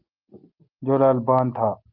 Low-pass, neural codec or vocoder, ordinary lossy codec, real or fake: 5.4 kHz; none; Opus, 32 kbps; real